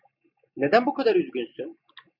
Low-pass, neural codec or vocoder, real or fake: 5.4 kHz; none; real